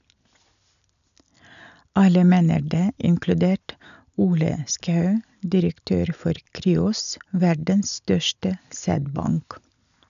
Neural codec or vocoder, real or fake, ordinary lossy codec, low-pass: none; real; none; 7.2 kHz